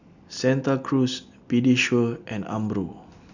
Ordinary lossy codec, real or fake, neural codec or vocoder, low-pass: none; real; none; 7.2 kHz